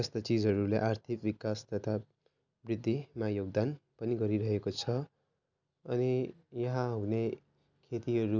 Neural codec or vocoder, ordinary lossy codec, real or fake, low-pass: none; none; real; 7.2 kHz